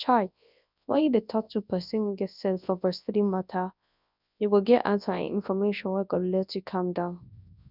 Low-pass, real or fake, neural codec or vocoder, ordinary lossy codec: 5.4 kHz; fake; codec, 24 kHz, 0.9 kbps, WavTokenizer, large speech release; none